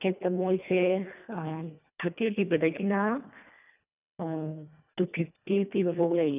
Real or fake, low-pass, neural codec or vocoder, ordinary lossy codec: fake; 3.6 kHz; codec, 24 kHz, 1.5 kbps, HILCodec; none